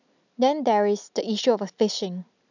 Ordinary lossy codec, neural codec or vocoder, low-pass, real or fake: none; none; 7.2 kHz; real